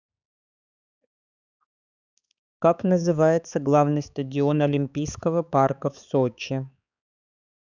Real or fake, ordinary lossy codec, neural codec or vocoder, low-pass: fake; none; codec, 16 kHz, 4 kbps, X-Codec, HuBERT features, trained on balanced general audio; 7.2 kHz